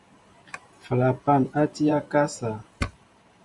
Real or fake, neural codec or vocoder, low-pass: fake; vocoder, 44.1 kHz, 128 mel bands every 512 samples, BigVGAN v2; 10.8 kHz